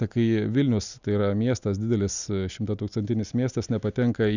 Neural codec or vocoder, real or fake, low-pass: none; real; 7.2 kHz